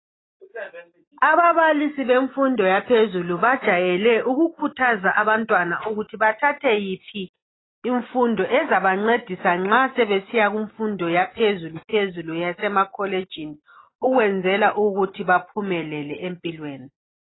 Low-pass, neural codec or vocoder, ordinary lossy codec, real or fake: 7.2 kHz; none; AAC, 16 kbps; real